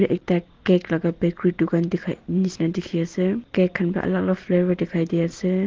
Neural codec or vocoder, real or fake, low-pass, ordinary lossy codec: none; real; 7.2 kHz; Opus, 16 kbps